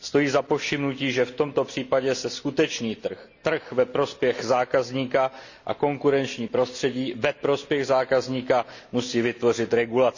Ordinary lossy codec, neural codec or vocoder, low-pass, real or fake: none; none; 7.2 kHz; real